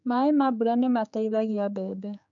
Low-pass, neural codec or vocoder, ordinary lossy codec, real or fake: 7.2 kHz; codec, 16 kHz, 4 kbps, X-Codec, HuBERT features, trained on general audio; none; fake